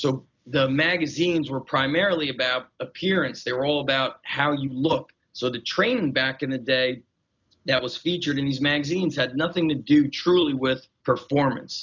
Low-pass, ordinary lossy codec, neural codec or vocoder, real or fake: 7.2 kHz; MP3, 64 kbps; none; real